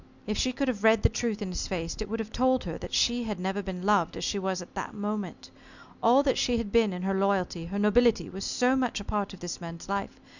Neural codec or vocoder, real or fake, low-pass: none; real; 7.2 kHz